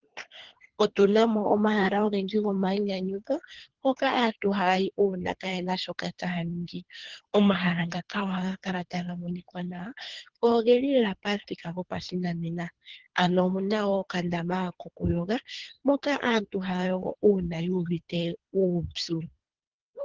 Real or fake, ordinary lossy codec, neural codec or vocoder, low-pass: fake; Opus, 16 kbps; codec, 24 kHz, 3 kbps, HILCodec; 7.2 kHz